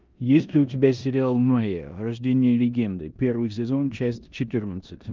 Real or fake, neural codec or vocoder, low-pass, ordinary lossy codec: fake; codec, 16 kHz in and 24 kHz out, 0.9 kbps, LongCat-Audio-Codec, four codebook decoder; 7.2 kHz; Opus, 24 kbps